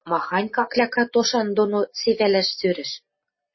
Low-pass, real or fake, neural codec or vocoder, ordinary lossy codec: 7.2 kHz; real; none; MP3, 24 kbps